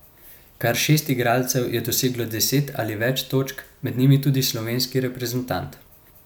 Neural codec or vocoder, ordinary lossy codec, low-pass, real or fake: none; none; none; real